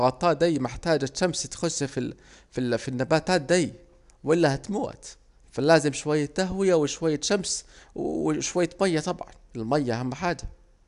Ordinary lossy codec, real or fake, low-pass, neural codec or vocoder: none; real; 10.8 kHz; none